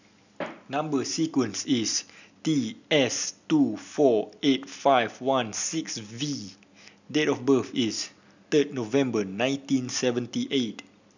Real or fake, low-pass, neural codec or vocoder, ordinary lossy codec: real; 7.2 kHz; none; none